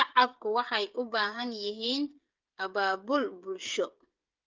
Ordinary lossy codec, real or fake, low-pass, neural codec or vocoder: Opus, 24 kbps; fake; 7.2 kHz; codec, 16 kHz, 8 kbps, FreqCodec, smaller model